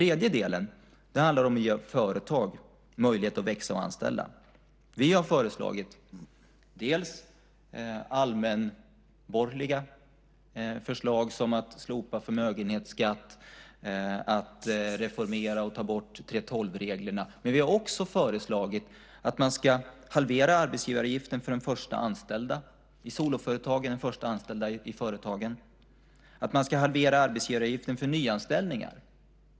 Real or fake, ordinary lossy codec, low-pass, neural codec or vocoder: real; none; none; none